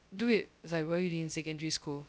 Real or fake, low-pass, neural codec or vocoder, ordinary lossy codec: fake; none; codec, 16 kHz, 0.2 kbps, FocalCodec; none